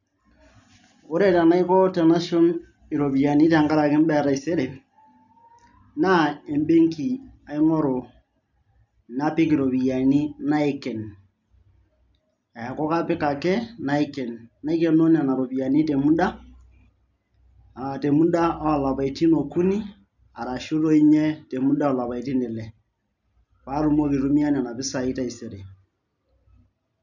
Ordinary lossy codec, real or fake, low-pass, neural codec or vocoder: none; real; 7.2 kHz; none